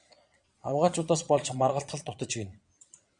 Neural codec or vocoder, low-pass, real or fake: vocoder, 22.05 kHz, 80 mel bands, Vocos; 9.9 kHz; fake